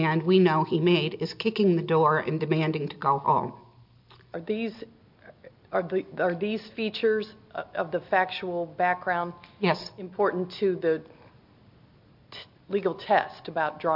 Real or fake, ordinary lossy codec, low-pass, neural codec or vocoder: real; MP3, 48 kbps; 5.4 kHz; none